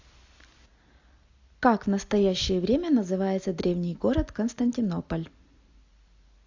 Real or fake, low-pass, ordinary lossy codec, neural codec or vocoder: real; 7.2 kHz; AAC, 48 kbps; none